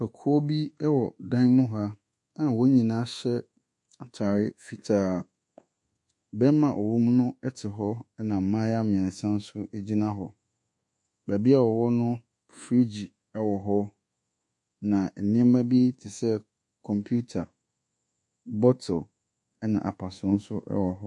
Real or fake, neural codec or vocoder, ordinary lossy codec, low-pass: fake; codec, 24 kHz, 1.2 kbps, DualCodec; MP3, 48 kbps; 10.8 kHz